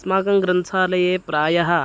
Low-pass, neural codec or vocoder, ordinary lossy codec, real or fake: none; none; none; real